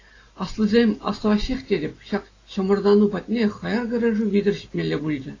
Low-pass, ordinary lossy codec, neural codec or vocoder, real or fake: 7.2 kHz; AAC, 32 kbps; none; real